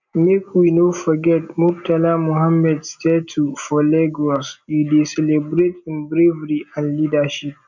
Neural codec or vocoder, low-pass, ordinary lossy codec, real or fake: none; 7.2 kHz; none; real